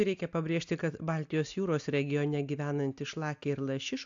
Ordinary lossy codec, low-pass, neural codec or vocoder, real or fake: AAC, 64 kbps; 7.2 kHz; none; real